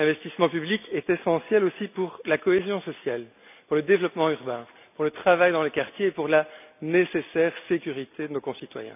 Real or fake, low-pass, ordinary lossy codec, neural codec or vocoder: real; 3.6 kHz; none; none